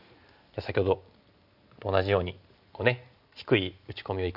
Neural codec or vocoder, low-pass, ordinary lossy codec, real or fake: none; 5.4 kHz; none; real